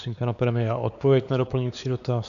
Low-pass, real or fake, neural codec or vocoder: 7.2 kHz; fake; codec, 16 kHz, 4 kbps, X-Codec, WavLM features, trained on Multilingual LibriSpeech